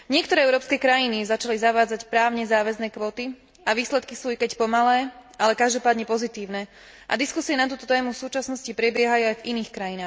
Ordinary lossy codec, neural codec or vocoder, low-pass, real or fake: none; none; none; real